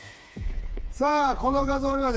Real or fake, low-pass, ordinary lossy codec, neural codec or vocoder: fake; none; none; codec, 16 kHz, 4 kbps, FreqCodec, smaller model